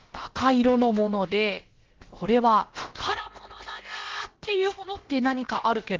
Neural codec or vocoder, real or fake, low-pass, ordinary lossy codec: codec, 16 kHz, about 1 kbps, DyCAST, with the encoder's durations; fake; 7.2 kHz; Opus, 16 kbps